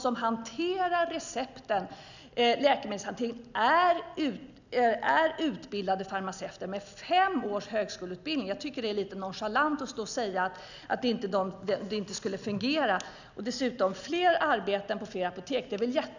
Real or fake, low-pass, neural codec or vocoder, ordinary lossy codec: real; 7.2 kHz; none; none